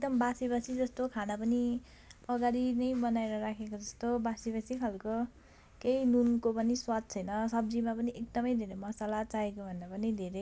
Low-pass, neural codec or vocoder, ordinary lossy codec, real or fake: none; none; none; real